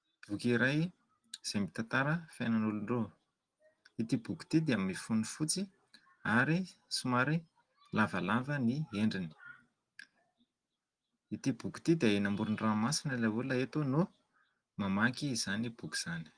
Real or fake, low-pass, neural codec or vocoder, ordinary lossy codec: real; 9.9 kHz; none; Opus, 24 kbps